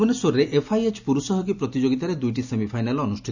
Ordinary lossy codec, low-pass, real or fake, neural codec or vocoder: none; 7.2 kHz; real; none